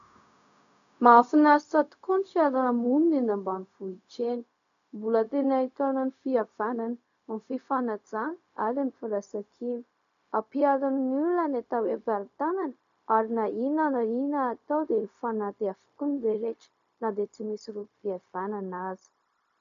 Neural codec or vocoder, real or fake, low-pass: codec, 16 kHz, 0.4 kbps, LongCat-Audio-Codec; fake; 7.2 kHz